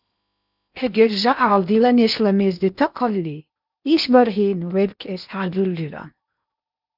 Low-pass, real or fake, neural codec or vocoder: 5.4 kHz; fake; codec, 16 kHz in and 24 kHz out, 0.8 kbps, FocalCodec, streaming, 65536 codes